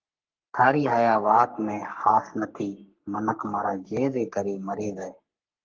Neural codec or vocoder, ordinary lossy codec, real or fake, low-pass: codec, 44.1 kHz, 3.4 kbps, Pupu-Codec; Opus, 32 kbps; fake; 7.2 kHz